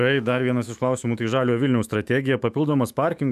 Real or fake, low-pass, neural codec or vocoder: fake; 14.4 kHz; autoencoder, 48 kHz, 128 numbers a frame, DAC-VAE, trained on Japanese speech